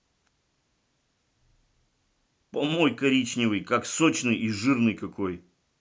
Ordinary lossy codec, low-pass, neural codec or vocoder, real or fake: none; none; none; real